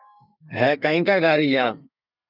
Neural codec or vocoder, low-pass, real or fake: codec, 32 kHz, 1.9 kbps, SNAC; 5.4 kHz; fake